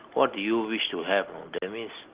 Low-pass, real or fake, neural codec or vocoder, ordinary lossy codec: 3.6 kHz; real; none; Opus, 16 kbps